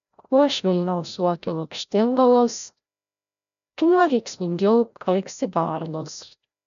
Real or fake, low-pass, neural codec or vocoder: fake; 7.2 kHz; codec, 16 kHz, 0.5 kbps, FreqCodec, larger model